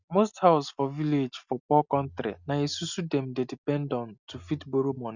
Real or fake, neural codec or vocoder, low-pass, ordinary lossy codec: real; none; 7.2 kHz; none